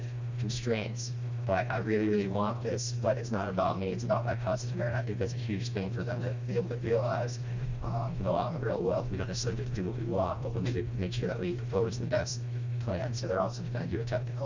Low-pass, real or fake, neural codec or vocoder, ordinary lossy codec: 7.2 kHz; fake; codec, 16 kHz, 1 kbps, FreqCodec, smaller model; MP3, 64 kbps